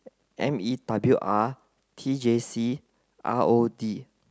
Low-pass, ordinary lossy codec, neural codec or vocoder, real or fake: none; none; none; real